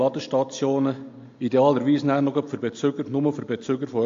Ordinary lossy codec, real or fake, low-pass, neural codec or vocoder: AAC, 48 kbps; real; 7.2 kHz; none